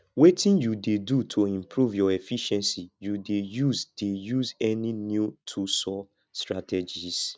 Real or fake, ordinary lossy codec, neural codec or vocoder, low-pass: real; none; none; none